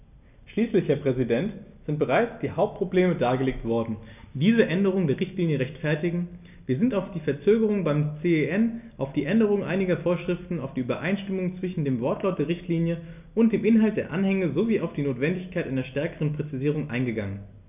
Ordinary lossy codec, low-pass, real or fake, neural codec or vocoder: none; 3.6 kHz; real; none